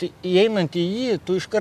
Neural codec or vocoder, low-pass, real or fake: none; 14.4 kHz; real